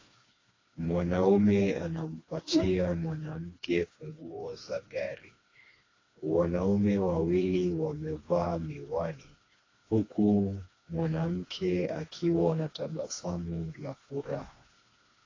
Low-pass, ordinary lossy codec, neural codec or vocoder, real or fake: 7.2 kHz; AAC, 32 kbps; codec, 16 kHz, 2 kbps, FreqCodec, smaller model; fake